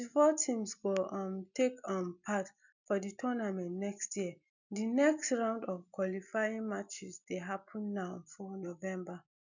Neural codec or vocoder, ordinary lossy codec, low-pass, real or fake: none; none; 7.2 kHz; real